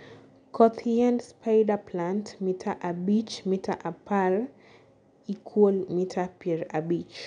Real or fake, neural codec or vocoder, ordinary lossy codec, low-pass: real; none; none; 9.9 kHz